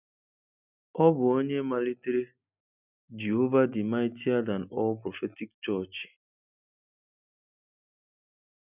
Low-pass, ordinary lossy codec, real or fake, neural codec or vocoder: 3.6 kHz; none; real; none